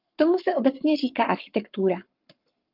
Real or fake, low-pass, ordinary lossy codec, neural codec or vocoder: fake; 5.4 kHz; Opus, 32 kbps; codec, 44.1 kHz, 7.8 kbps, Pupu-Codec